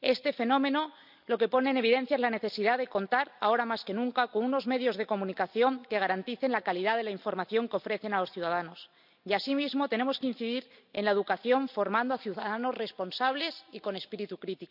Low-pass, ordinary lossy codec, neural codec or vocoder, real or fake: 5.4 kHz; none; none; real